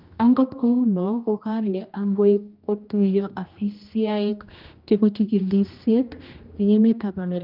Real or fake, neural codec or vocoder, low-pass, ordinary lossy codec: fake; codec, 16 kHz, 1 kbps, X-Codec, HuBERT features, trained on general audio; 5.4 kHz; Opus, 24 kbps